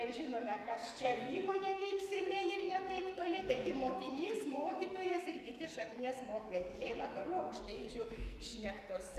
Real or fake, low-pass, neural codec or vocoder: fake; 14.4 kHz; codec, 44.1 kHz, 2.6 kbps, SNAC